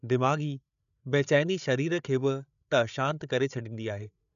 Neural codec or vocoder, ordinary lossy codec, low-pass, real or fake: codec, 16 kHz, 8 kbps, FreqCodec, larger model; none; 7.2 kHz; fake